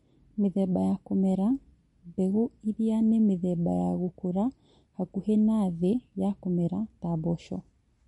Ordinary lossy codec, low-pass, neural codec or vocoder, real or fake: MP3, 48 kbps; 19.8 kHz; none; real